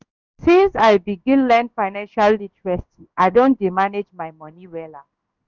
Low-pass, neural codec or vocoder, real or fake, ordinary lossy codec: 7.2 kHz; codec, 16 kHz in and 24 kHz out, 1 kbps, XY-Tokenizer; fake; none